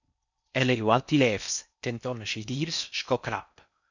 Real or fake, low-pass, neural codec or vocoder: fake; 7.2 kHz; codec, 16 kHz in and 24 kHz out, 0.6 kbps, FocalCodec, streaming, 4096 codes